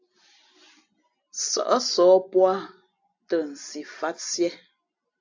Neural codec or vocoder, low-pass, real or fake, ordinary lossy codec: none; 7.2 kHz; real; AAC, 48 kbps